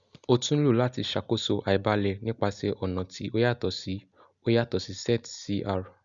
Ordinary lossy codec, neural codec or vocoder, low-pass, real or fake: Opus, 64 kbps; none; 7.2 kHz; real